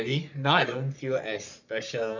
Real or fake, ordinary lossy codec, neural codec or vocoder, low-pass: fake; none; codec, 44.1 kHz, 3.4 kbps, Pupu-Codec; 7.2 kHz